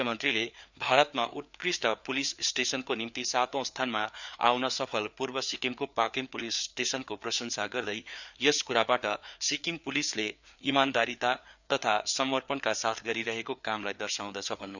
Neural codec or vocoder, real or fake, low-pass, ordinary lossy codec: codec, 16 kHz, 4 kbps, FreqCodec, larger model; fake; 7.2 kHz; none